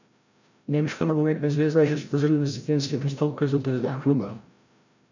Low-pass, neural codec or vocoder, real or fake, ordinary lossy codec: 7.2 kHz; codec, 16 kHz, 0.5 kbps, FreqCodec, larger model; fake; none